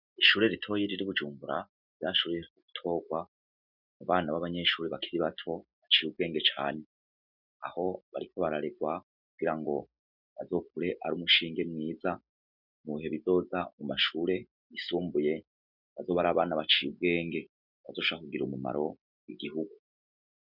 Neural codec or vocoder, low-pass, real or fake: none; 5.4 kHz; real